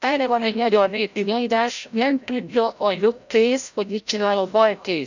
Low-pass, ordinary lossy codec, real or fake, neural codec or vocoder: 7.2 kHz; none; fake; codec, 16 kHz, 0.5 kbps, FreqCodec, larger model